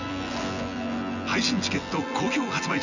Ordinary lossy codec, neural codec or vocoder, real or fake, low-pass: none; vocoder, 24 kHz, 100 mel bands, Vocos; fake; 7.2 kHz